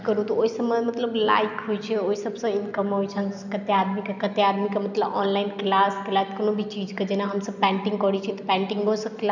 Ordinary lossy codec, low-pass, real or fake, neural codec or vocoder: none; 7.2 kHz; real; none